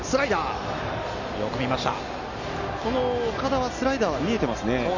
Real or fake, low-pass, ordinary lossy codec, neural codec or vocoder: real; 7.2 kHz; none; none